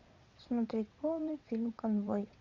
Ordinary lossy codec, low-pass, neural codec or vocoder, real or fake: Opus, 64 kbps; 7.2 kHz; vocoder, 22.05 kHz, 80 mel bands, WaveNeXt; fake